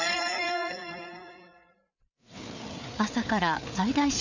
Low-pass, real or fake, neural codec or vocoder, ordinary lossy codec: 7.2 kHz; fake; codec, 16 kHz, 4 kbps, FreqCodec, larger model; none